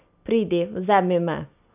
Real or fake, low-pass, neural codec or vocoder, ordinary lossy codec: real; 3.6 kHz; none; none